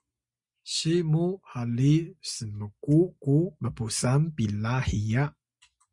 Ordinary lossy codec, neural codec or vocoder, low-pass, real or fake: Opus, 64 kbps; none; 10.8 kHz; real